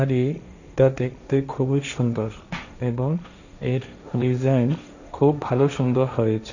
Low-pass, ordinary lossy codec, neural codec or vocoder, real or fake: 7.2 kHz; none; codec, 16 kHz, 1.1 kbps, Voila-Tokenizer; fake